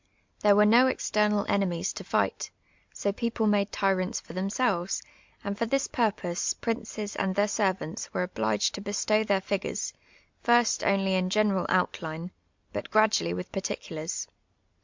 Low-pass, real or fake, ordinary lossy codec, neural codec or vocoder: 7.2 kHz; real; MP3, 64 kbps; none